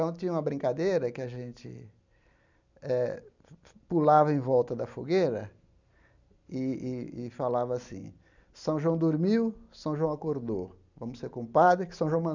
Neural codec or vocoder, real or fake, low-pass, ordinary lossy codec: none; real; 7.2 kHz; none